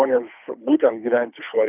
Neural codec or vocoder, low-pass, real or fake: codec, 24 kHz, 3 kbps, HILCodec; 3.6 kHz; fake